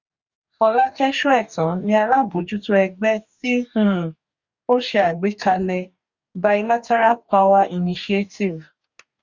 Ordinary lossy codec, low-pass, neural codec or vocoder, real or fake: Opus, 64 kbps; 7.2 kHz; codec, 44.1 kHz, 2.6 kbps, DAC; fake